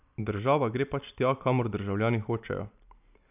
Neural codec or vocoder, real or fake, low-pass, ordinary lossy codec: none; real; 3.6 kHz; none